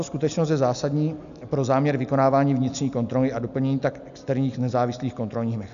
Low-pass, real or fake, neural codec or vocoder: 7.2 kHz; real; none